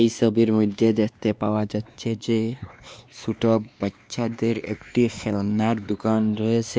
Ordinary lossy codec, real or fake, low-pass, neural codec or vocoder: none; fake; none; codec, 16 kHz, 2 kbps, X-Codec, WavLM features, trained on Multilingual LibriSpeech